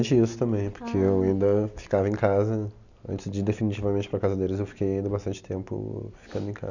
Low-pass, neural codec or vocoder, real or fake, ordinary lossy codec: 7.2 kHz; none; real; none